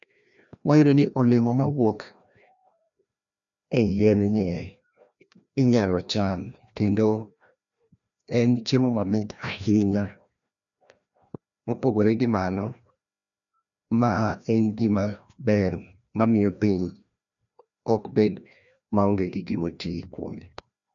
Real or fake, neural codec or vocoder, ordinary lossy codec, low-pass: fake; codec, 16 kHz, 1 kbps, FreqCodec, larger model; none; 7.2 kHz